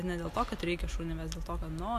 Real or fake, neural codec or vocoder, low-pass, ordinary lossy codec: real; none; 14.4 kHz; MP3, 96 kbps